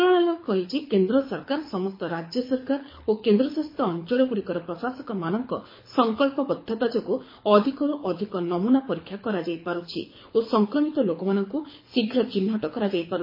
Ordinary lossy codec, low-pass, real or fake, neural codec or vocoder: MP3, 24 kbps; 5.4 kHz; fake; codec, 24 kHz, 6 kbps, HILCodec